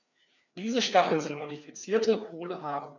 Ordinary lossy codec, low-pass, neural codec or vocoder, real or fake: none; 7.2 kHz; codec, 16 kHz, 2 kbps, FreqCodec, larger model; fake